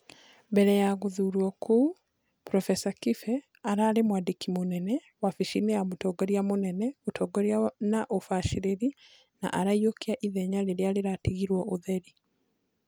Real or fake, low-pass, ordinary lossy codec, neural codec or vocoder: real; none; none; none